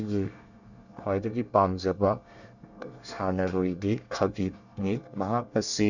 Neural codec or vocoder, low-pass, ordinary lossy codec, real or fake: codec, 24 kHz, 1 kbps, SNAC; 7.2 kHz; none; fake